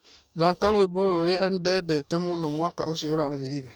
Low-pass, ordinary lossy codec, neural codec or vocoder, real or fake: 19.8 kHz; none; codec, 44.1 kHz, 2.6 kbps, DAC; fake